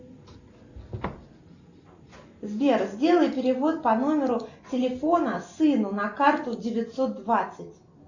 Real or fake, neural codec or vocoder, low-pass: real; none; 7.2 kHz